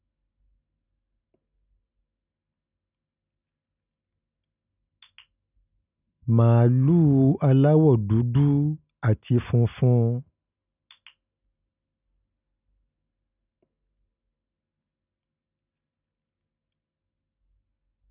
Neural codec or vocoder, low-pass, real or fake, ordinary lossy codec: none; 3.6 kHz; real; none